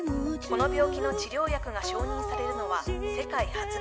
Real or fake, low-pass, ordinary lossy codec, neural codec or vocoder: real; none; none; none